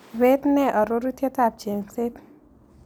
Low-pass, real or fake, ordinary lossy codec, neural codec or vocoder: none; real; none; none